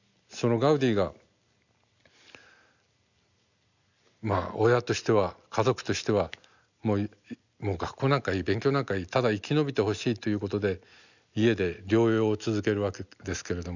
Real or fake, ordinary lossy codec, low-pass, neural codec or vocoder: real; none; 7.2 kHz; none